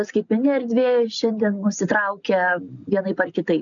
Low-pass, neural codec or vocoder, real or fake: 7.2 kHz; none; real